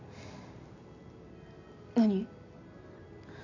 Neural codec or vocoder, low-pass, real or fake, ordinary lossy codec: none; 7.2 kHz; real; none